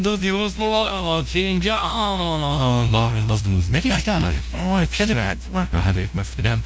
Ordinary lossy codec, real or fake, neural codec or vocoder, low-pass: none; fake; codec, 16 kHz, 0.5 kbps, FunCodec, trained on LibriTTS, 25 frames a second; none